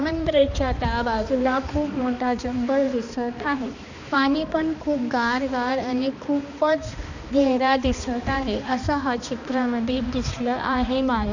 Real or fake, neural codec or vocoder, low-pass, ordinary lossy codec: fake; codec, 16 kHz, 2 kbps, X-Codec, HuBERT features, trained on balanced general audio; 7.2 kHz; none